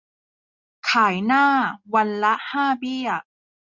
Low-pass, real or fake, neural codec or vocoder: 7.2 kHz; real; none